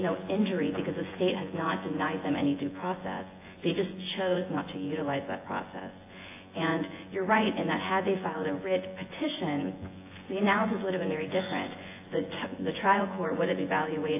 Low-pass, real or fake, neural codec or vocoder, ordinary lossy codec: 3.6 kHz; fake; vocoder, 24 kHz, 100 mel bands, Vocos; AAC, 24 kbps